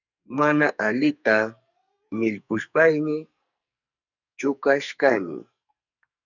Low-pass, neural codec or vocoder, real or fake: 7.2 kHz; codec, 44.1 kHz, 2.6 kbps, SNAC; fake